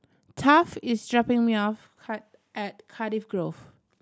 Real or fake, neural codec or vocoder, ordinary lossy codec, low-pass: real; none; none; none